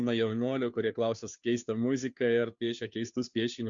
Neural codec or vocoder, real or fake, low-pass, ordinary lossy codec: codec, 16 kHz, 2 kbps, FunCodec, trained on Chinese and English, 25 frames a second; fake; 7.2 kHz; Opus, 64 kbps